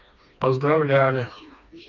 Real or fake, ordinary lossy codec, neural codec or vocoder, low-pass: fake; none; codec, 16 kHz, 2 kbps, FreqCodec, smaller model; 7.2 kHz